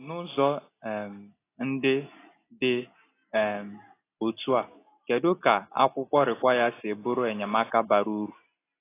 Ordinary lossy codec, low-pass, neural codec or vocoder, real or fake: AAC, 24 kbps; 3.6 kHz; none; real